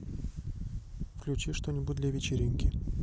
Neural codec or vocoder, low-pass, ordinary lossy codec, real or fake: none; none; none; real